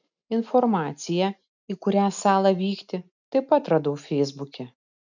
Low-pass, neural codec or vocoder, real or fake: 7.2 kHz; none; real